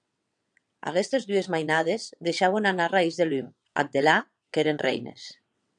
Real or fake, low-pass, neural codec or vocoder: fake; 9.9 kHz; vocoder, 22.05 kHz, 80 mel bands, WaveNeXt